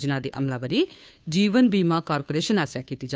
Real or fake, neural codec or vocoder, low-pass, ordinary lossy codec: fake; codec, 16 kHz, 2 kbps, FunCodec, trained on Chinese and English, 25 frames a second; none; none